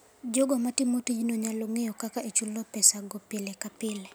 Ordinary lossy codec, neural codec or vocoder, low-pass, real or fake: none; none; none; real